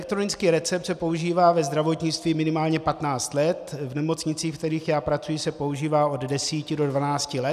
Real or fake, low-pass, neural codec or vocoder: real; 14.4 kHz; none